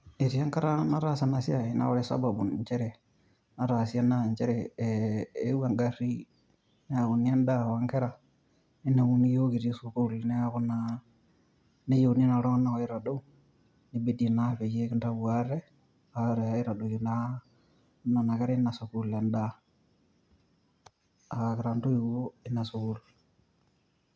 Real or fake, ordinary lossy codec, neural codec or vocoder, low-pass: real; none; none; none